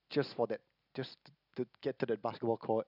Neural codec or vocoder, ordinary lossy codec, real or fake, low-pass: none; none; real; 5.4 kHz